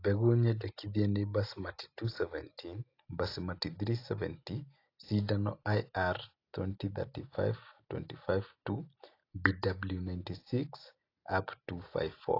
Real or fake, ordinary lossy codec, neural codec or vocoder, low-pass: real; AAC, 32 kbps; none; 5.4 kHz